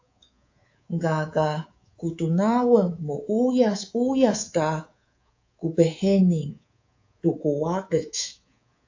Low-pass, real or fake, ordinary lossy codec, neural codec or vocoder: 7.2 kHz; fake; AAC, 48 kbps; codec, 24 kHz, 3.1 kbps, DualCodec